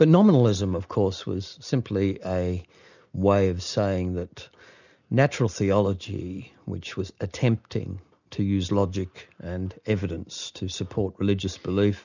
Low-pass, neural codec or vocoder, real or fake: 7.2 kHz; none; real